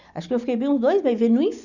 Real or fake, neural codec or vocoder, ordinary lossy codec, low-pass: real; none; none; 7.2 kHz